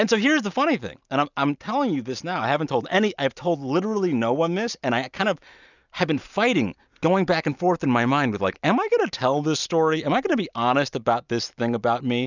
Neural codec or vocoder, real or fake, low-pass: none; real; 7.2 kHz